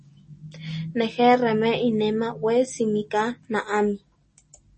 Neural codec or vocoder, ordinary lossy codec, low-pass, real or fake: none; MP3, 32 kbps; 10.8 kHz; real